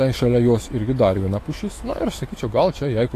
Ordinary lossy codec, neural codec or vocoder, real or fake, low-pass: AAC, 48 kbps; autoencoder, 48 kHz, 128 numbers a frame, DAC-VAE, trained on Japanese speech; fake; 14.4 kHz